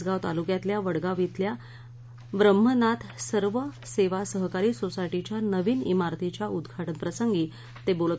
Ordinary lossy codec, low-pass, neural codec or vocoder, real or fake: none; none; none; real